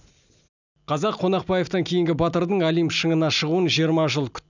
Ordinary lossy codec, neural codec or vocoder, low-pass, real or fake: none; none; 7.2 kHz; real